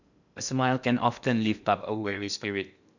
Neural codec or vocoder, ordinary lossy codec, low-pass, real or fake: codec, 16 kHz in and 24 kHz out, 0.8 kbps, FocalCodec, streaming, 65536 codes; none; 7.2 kHz; fake